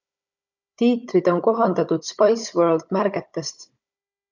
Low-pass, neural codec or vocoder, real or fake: 7.2 kHz; codec, 16 kHz, 16 kbps, FunCodec, trained on Chinese and English, 50 frames a second; fake